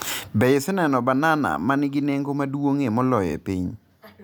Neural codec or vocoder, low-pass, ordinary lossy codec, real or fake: none; none; none; real